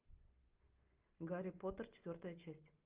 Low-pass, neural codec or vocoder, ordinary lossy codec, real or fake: 3.6 kHz; none; Opus, 32 kbps; real